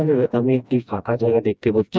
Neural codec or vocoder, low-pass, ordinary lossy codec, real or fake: codec, 16 kHz, 1 kbps, FreqCodec, smaller model; none; none; fake